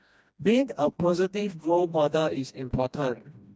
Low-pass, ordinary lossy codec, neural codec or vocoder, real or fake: none; none; codec, 16 kHz, 1 kbps, FreqCodec, smaller model; fake